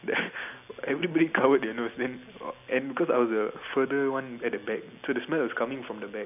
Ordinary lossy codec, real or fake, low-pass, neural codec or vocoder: AAC, 32 kbps; real; 3.6 kHz; none